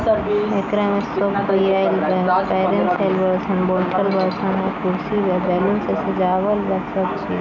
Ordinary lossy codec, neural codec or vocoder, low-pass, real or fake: none; none; 7.2 kHz; real